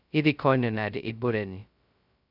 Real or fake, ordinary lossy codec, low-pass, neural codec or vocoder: fake; none; 5.4 kHz; codec, 16 kHz, 0.2 kbps, FocalCodec